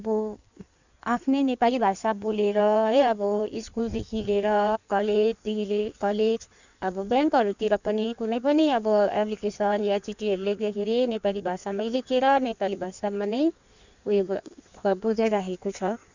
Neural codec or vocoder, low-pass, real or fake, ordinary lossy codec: codec, 16 kHz in and 24 kHz out, 1.1 kbps, FireRedTTS-2 codec; 7.2 kHz; fake; none